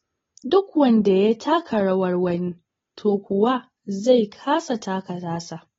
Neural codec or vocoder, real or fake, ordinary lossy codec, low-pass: none; real; AAC, 24 kbps; 7.2 kHz